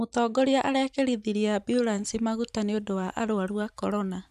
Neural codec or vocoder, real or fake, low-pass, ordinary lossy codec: vocoder, 44.1 kHz, 128 mel bands every 512 samples, BigVGAN v2; fake; 14.4 kHz; none